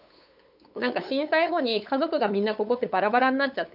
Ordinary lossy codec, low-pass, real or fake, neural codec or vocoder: none; 5.4 kHz; fake; codec, 16 kHz, 8 kbps, FunCodec, trained on LibriTTS, 25 frames a second